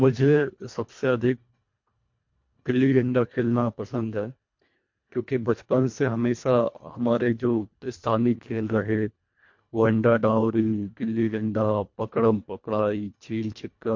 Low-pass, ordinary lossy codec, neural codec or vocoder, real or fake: 7.2 kHz; MP3, 48 kbps; codec, 24 kHz, 1.5 kbps, HILCodec; fake